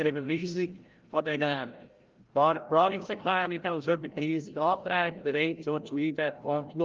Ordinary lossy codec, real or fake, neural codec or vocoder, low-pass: Opus, 24 kbps; fake; codec, 16 kHz, 0.5 kbps, FreqCodec, larger model; 7.2 kHz